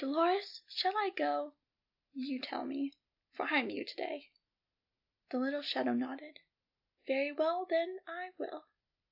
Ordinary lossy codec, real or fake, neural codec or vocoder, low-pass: AAC, 48 kbps; real; none; 5.4 kHz